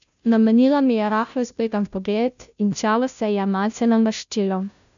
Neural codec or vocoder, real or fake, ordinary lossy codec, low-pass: codec, 16 kHz, 0.5 kbps, FunCodec, trained on Chinese and English, 25 frames a second; fake; none; 7.2 kHz